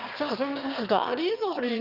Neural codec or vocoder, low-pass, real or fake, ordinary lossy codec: autoencoder, 22.05 kHz, a latent of 192 numbers a frame, VITS, trained on one speaker; 5.4 kHz; fake; Opus, 24 kbps